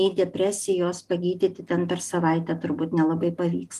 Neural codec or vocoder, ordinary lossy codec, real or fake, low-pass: none; Opus, 32 kbps; real; 14.4 kHz